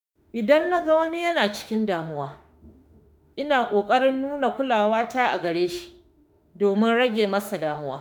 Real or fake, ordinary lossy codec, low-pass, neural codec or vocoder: fake; none; none; autoencoder, 48 kHz, 32 numbers a frame, DAC-VAE, trained on Japanese speech